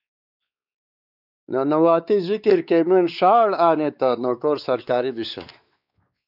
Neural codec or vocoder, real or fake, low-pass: codec, 16 kHz, 4 kbps, X-Codec, WavLM features, trained on Multilingual LibriSpeech; fake; 5.4 kHz